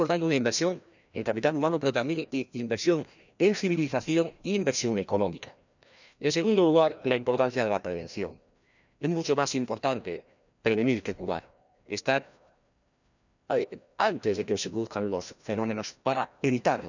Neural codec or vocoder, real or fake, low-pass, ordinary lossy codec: codec, 16 kHz, 1 kbps, FreqCodec, larger model; fake; 7.2 kHz; none